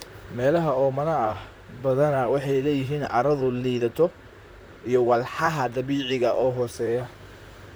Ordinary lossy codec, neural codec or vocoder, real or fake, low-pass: none; vocoder, 44.1 kHz, 128 mel bands, Pupu-Vocoder; fake; none